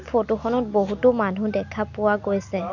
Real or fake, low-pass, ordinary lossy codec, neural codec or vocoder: real; 7.2 kHz; none; none